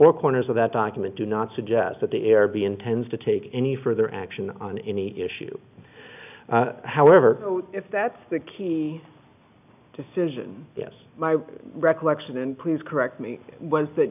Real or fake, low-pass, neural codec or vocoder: real; 3.6 kHz; none